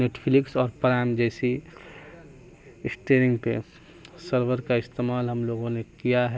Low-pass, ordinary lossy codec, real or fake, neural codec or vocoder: none; none; real; none